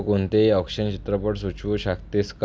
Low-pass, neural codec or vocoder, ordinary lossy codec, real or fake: none; none; none; real